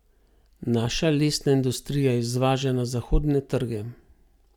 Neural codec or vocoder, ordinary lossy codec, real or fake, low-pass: none; none; real; 19.8 kHz